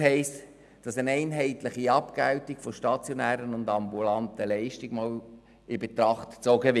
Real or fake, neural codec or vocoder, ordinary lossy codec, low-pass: real; none; none; none